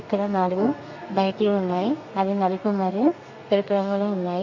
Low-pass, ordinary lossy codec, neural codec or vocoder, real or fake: 7.2 kHz; AAC, 48 kbps; codec, 32 kHz, 1.9 kbps, SNAC; fake